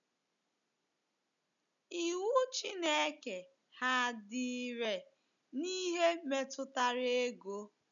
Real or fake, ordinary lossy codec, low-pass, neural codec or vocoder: real; none; 7.2 kHz; none